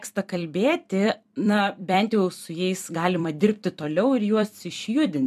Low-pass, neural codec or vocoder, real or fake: 14.4 kHz; vocoder, 44.1 kHz, 128 mel bands every 256 samples, BigVGAN v2; fake